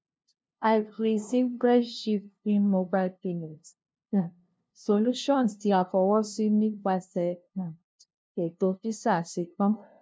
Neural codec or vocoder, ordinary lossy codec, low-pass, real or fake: codec, 16 kHz, 0.5 kbps, FunCodec, trained on LibriTTS, 25 frames a second; none; none; fake